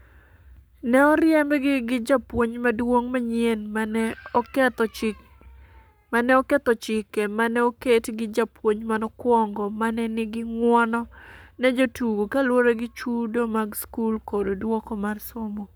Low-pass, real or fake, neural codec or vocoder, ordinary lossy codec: none; fake; codec, 44.1 kHz, 7.8 kbps, Pupu-Codec; none